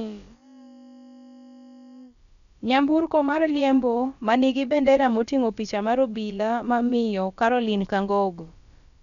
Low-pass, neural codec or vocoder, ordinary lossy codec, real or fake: 7.2 kHz; codec, 16 kHz, about 1 kbps, DyCAST, with the encoder's durations; none; fake